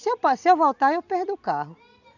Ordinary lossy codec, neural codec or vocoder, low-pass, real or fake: none; none; 7.2 kHz; real